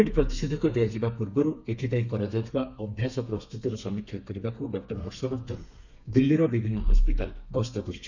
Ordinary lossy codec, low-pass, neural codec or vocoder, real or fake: none; 7.2 kHz; codec, 32 kHz, 1.9 kbps, SNAC; fake